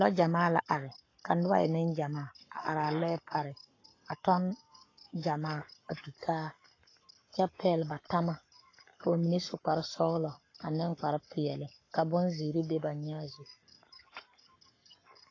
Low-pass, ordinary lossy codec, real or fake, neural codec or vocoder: 7.2 kHz; AAC, 32 kbps; fake; codec, 44.1 kHz, 7.8 kbps, Pupu-Codec